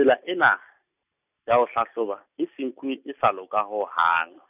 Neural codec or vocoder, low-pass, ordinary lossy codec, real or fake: none; 3.6 kHz; none; real